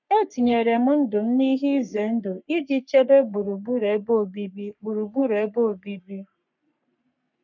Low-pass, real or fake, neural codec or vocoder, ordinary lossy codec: 7.2 kHz; fake; codec, 44.1 kHz, 3.4 kbps, Pupu-Codec; none